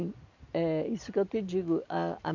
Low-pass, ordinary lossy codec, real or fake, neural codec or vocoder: 7.2 kHz; none; real; none